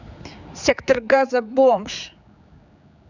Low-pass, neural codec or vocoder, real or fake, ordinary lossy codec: 7.2 kHz; codec, 16 kHz, 4 kbps, X-Codec, HuBERT features, trained on general audio; fake; none